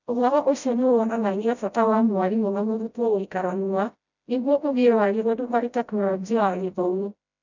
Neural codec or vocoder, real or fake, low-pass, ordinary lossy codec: codec, 16 kHz, 0.5 kbps, FreqCodec, smaller model; fake; 7.2 kHz; none